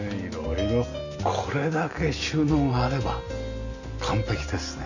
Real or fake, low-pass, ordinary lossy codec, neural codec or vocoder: real; 7.2 kHz; none; none